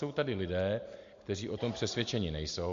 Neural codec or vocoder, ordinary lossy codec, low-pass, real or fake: none; MP3, 48 kbps; 7.2 kHz; real